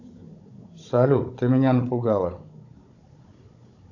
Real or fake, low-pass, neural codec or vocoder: fake; 7.2 kHz; codec, 16 kHz, 16 kbps, FunCodec, trained on Chinese and English, 50 frames a second